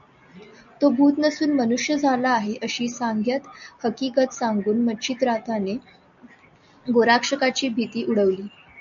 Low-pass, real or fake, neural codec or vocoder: 7.2 kHz; real; none